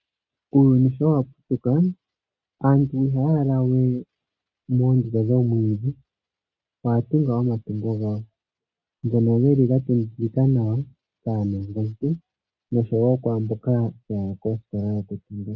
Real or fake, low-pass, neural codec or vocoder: real; 7.2 kHz; none